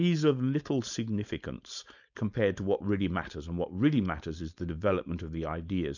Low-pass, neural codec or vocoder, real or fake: 7.2 kHz; codec, 16 kHz, 4.8 kbps, FACodec; fake